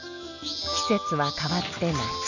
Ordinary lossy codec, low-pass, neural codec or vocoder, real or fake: none; 7.2 kHz; none; real